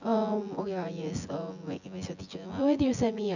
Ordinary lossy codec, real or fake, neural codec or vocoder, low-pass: none; fake; vocoder, 24 kHz, 100 mel bands, Vocos; 7.2 kHz